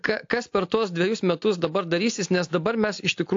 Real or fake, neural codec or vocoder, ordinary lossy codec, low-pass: real; none; AAC, 48 kbps; 7.2 kHz